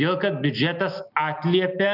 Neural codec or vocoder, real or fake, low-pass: none; real; 5.4 kHz